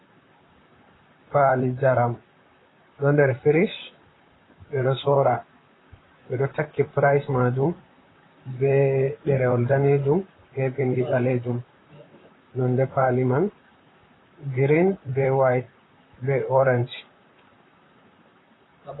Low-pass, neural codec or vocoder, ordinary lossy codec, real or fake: 7.2 kHz; vocoder, 44.1 kHz, 128 mel bands, Pupu-Vocoder; AAC, 16 kbps; fake